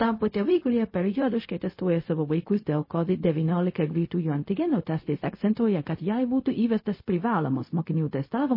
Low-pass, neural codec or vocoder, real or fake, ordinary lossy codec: 5.4 kHz; codec, 16 kHz, 0.4 kbps, LongCat-Audio-Codec; fake; MP3, 24 kbps